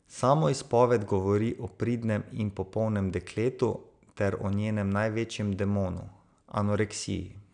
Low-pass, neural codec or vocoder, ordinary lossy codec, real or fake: 9.9 kHz; none; none; real